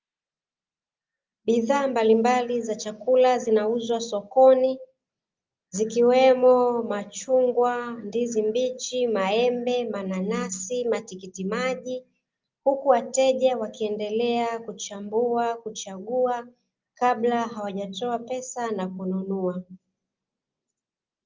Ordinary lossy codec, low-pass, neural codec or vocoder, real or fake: Opus, 24 kbps; 7.2 kHz; none; real